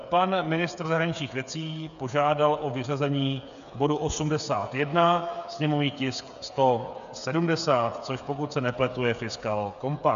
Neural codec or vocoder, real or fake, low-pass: codec, 16 kHz, 8 kbps, FreqCodec, smaller model; fake; 7.2 kHz